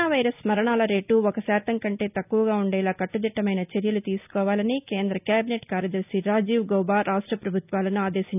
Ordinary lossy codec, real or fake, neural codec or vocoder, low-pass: none; real; none; 3.6 kHz